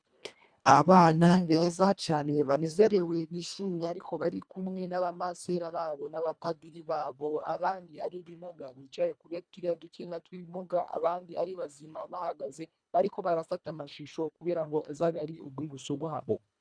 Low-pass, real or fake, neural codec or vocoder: 9.9 kHz; fake; codec, 24 kHz, 1.5 kbps, HILCodec